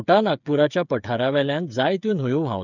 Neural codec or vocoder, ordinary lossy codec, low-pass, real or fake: codec, 16 kHz, 8 kbps, FreqCodec, smaller model; none; 7.2 kHz; fake